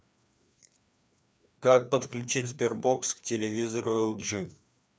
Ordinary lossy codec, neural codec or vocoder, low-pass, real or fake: none; codec, 16 kHz, 2 kbps, FreqCodec, larger model; none; fake